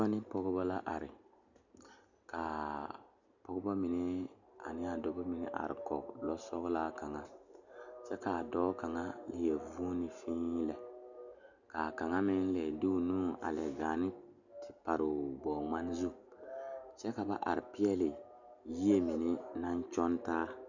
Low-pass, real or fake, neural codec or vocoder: 7.2 kHz; real; none